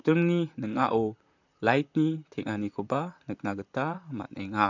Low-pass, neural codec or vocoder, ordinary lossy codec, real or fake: 7.2 kHz; vocoder, 44.1 kHz, 128 mel bands, Pupu-Vocoder; none; fake